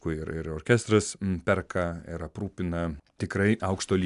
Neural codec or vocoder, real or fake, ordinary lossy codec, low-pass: none; real; MP3, 96 kbps; 10.8 kHz